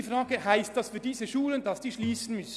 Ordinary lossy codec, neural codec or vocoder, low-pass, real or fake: none; none; none; real